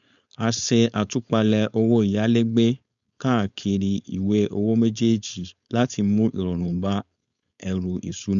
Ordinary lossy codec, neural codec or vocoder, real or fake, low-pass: none; codec, 16 kHz, 4.8 kbps, FACodec; fake; 7.2 kHz